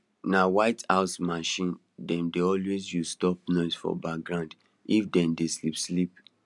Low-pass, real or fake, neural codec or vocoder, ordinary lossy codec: 10.8 kHz; real; none; none